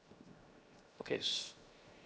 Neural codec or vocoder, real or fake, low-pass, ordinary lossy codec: codec, 16 kHz, 0.7 kbps, FocalCodec; fake; none; none